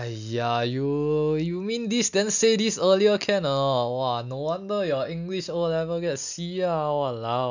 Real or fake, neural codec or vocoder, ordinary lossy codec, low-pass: real; none; none; 7.2 kHz